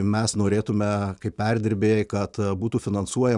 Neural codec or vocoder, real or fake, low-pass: none; real; 10.8 kHz